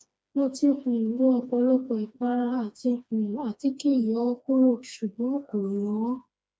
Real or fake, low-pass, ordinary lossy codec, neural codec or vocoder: fake; none; none; codec, 16 kHz, 2 kbps, FreqCodec, smaller model